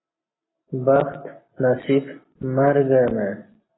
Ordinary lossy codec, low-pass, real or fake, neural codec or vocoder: AAC, 16 kbps; 7.2 kHz; real; none